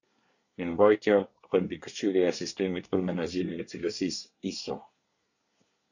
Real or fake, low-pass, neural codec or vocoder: fake; 7.2 kHz; codec, 24 kHz, 1 kbps, SNAC